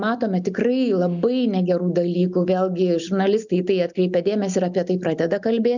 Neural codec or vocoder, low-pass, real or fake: none; 7.2 kHz; real